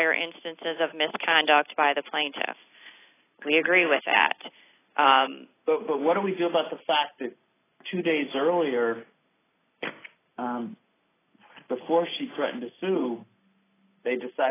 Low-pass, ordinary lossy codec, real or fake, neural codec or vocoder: 3.6 kHz; AAC, 16 kbps; real; none